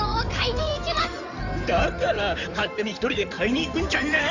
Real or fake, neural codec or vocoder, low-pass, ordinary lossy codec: fake; codec, 16 kHz in and 24 kHz out, 2.2 kbps, FireRedTTS-2 codec; 7.2 kHz; none